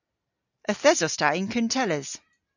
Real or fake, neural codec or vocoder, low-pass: real; none; 7.2 kHz